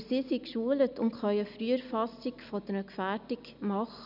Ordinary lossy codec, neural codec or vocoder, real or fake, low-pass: none; none; real; 5.4 kHz